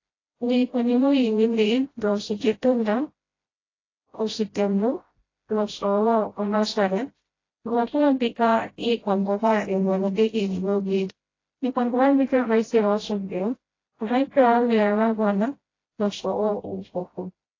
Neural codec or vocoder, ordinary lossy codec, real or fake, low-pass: codec, 16 kHz, 0.5 kbps, FreqCodec, smaller model; AAC, 32 kbps; fake; 7.2 kHz